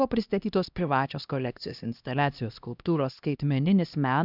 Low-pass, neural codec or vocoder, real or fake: 5.4 kHz; codec, 16 kHz, 1 kbps, X-Codec, HuBERT features, trained on LibriSpeech; fake